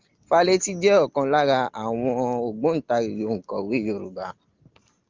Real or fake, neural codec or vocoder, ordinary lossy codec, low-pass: real; none; Opus, 32 kbps; 7.2 kHz